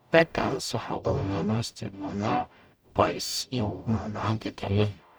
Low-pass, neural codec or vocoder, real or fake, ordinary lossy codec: none; codec, 44.1 kHz, 0.9 kbps, DAC; fake; none